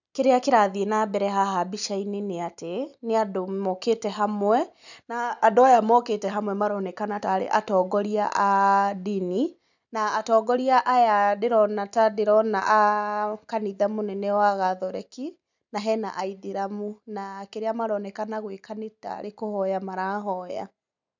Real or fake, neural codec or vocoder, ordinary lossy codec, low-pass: real; none; none; 7.2 kHz